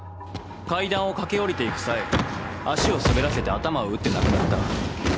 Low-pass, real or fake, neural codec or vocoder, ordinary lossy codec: none; real; none; none